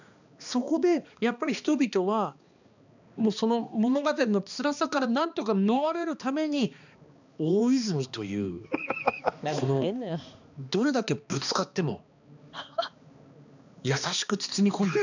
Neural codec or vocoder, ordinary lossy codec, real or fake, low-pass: codec, 16 kHz, 2 kbps, X-Codec, HuBERT features, trained on balanced general audio; none; fake; 7.2 kHz